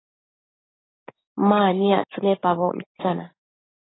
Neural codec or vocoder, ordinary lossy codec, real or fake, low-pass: codec, 16 kHz, 6 kbps, DAC; AAC, 16 kbps; fake; 7.2 kHz